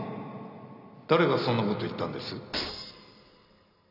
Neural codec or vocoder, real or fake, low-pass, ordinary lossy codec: none; real; 5.4 kHz; none